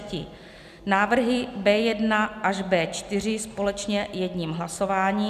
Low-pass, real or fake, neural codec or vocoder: 14.4 kHz; real; none